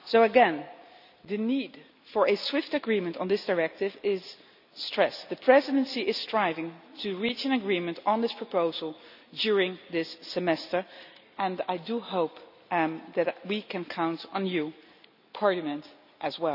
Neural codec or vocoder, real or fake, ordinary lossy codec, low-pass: none; real; none; 5.4 kHz